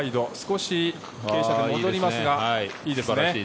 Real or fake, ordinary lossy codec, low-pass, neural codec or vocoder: real; none; none; none